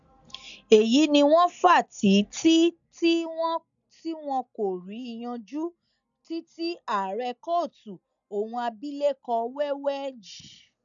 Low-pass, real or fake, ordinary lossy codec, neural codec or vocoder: 7.2 kHz; real; none; none